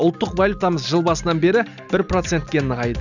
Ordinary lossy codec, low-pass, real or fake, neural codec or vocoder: none; 7.2 kHz; real; none